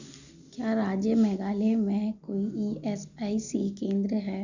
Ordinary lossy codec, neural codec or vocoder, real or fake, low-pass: none; none; real; 7.2 kHz